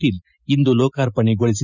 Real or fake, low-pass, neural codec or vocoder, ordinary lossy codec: real; 7.2 kHz; none; none